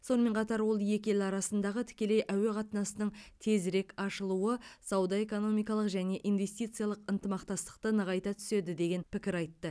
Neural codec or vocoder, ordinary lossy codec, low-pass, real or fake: none; none; none; real